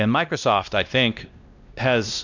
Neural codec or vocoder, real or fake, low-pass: codec, 16 kHz, 1 kbps, X-Codec, WavLM features, trained on Multilingual LibriSpeech; fake; 7.2 kHz